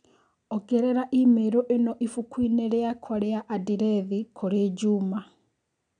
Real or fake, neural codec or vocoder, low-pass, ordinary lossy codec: real; none; 9.9 kHz; none